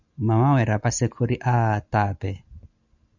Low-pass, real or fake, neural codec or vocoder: 7.2 kHz; real; none